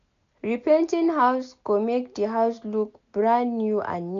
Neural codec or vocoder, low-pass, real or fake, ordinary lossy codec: none; 7.2 kHz; real; none